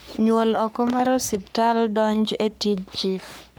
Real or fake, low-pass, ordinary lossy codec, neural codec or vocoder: fake; none; none; codec, 44.1 kHz, 3.4 kbps, Pupu-Codec